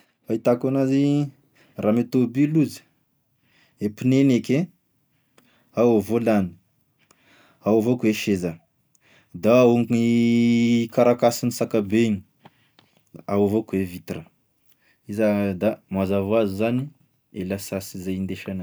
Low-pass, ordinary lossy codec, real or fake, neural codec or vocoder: none; none; real; none